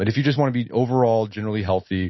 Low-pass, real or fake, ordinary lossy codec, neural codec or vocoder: 7.2 kHz; real; MP3, 24 kbps; none